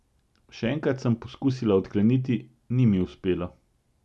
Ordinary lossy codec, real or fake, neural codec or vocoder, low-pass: none; real; none; none